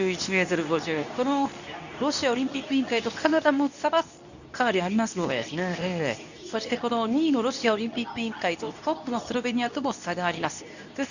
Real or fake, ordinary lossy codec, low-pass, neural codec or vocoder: fake; none; 7.2 kHz; codec, 24 kHz, 0.9 kbps, WavTokenizer, medium speech release version 2